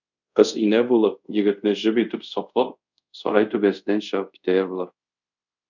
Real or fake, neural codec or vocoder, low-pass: fake; codec, 24 kHz, 0.5 kbps, DualCodec; 7.2 kHz